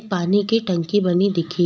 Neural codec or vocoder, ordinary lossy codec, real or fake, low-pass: none; none; real; none